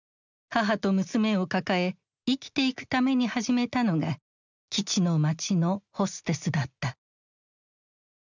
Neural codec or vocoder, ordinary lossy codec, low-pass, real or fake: none; none; 7.2 kHz; real